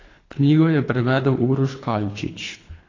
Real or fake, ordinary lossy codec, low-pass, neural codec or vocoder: fake; AAC, 32 kbps; 7.2 kHz; codec, 24 kHz, 3 kbps, HILCodec